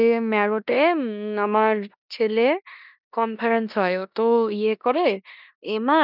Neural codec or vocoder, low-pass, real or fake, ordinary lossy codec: codec, 16 kHz in and 24 kHz out, 0.9 kbps, LongCat-Audio-Codec, four codebook decoder; 5.4 kHz; fake; none